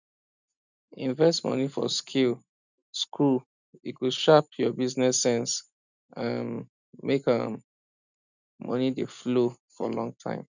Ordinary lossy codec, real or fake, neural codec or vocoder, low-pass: none; real; none; 7.2 kHz